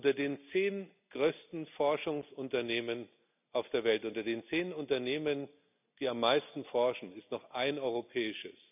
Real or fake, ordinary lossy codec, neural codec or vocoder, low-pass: real; none; none; 3.6 kHz